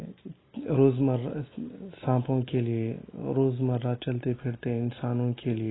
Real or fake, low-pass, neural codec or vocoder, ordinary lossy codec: real; 7.2 kHz; none; AAC, 16 kbps